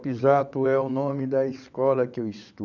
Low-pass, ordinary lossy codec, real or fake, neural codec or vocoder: 7.2 kHz; none; fake; vocoder, 22.05 kHz, 80 mel bands, WaveNeXt